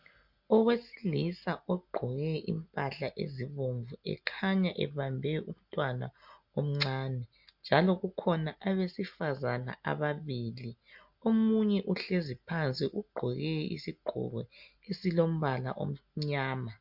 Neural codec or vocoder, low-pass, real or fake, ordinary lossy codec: none; 5.4 kHz; real; MP3, 48 kbps